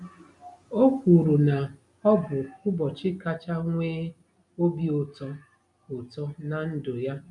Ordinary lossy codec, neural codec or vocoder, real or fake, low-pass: MP3, 64 kbps; none; real; 10.8 kHz